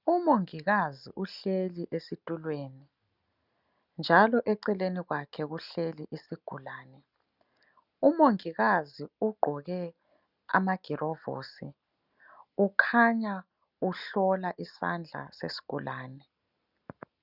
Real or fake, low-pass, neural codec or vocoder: real; 5.4 kHz; none